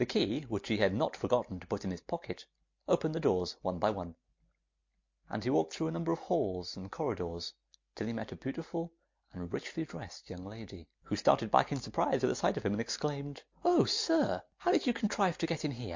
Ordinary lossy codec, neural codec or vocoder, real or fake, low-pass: MP3, 48 kbps; none; real; 7.2 kHz